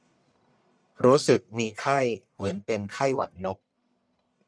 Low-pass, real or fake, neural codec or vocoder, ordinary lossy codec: 9.9 kHz; fake; codec, 44.1 kHz, 1.7 kbps, Pupu-Codec; none